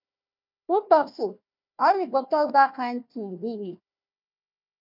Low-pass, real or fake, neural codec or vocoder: 5.4 kHz; fake; codec, 16 kHz, 1 kbps, FunCodec, trained on Chinese and English, 50 frames a second